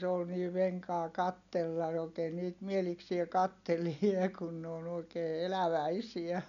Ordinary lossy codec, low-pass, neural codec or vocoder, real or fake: none; 7.2 kHz; none; real